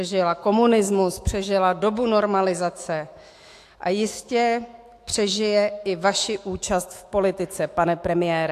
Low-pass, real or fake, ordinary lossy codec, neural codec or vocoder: 14.4 kHz; fake; AAC, 64 kbps; autoencoder, 48 kHz, 128 numbers a frame, DAC-VAE, trained on Japanese speech